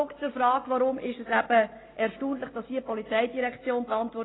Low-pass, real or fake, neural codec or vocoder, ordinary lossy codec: 7.2 kHz; real; none; AAC, 16 kbps